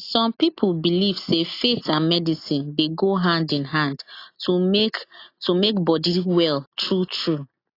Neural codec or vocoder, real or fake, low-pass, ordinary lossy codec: none; real; 5.4 kHz; AAC, 32 kbps